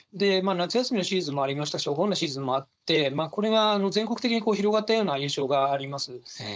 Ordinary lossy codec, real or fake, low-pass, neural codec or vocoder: none; fake; none; codec, 16 kHz, 4.8 kbps, FACodec